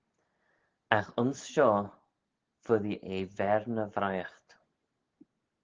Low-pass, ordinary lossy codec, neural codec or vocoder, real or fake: 7.2 kHz; Opus, 16 kbps; none; real